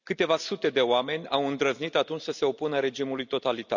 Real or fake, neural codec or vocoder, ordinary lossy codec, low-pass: real; none; none; 7.2 kHz